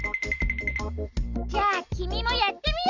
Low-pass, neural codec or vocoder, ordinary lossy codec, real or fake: 7.2 kHz; none; Opus, 64 kbps; real